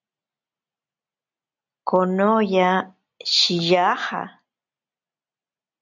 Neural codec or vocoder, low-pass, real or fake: none; 7.2 kHz; real